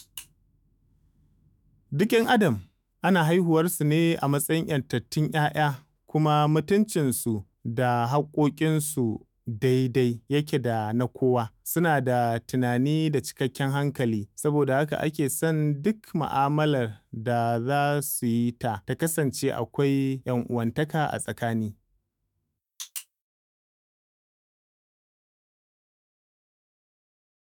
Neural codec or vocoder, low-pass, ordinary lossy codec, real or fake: autoencoder, 48 kHz, 128 numbers a frame, DAC-VAE, trained on Japanese speech; 19.8 kHz; none; fake